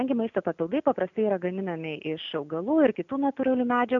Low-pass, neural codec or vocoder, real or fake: 7.2 kHz; none; real